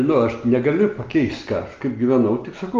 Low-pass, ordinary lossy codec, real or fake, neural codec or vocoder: 7.2 kHz; Opus, 32 kbps; real; none